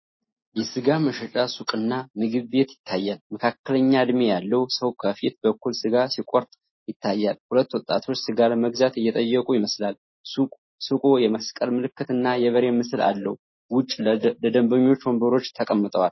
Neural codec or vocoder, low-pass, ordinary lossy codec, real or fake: none; 7.2 kHz; MP3, 24 kbps; real